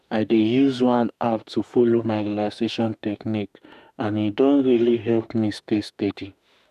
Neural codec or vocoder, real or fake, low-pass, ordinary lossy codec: autoencoder, 48 kHz, 32 numbers a frame, DAC-VAE, trained on Japanese speech; fake; 14.4 kHz; none